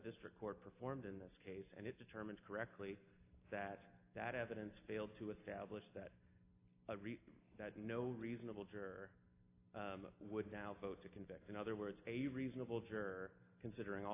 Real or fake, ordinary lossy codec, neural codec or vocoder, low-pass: real; AAC, 24 kbps; none; 3.6 kHz